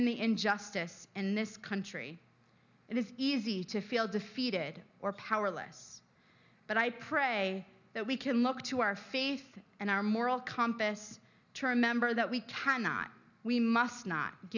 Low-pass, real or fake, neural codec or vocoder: 7.2 kHz; real; none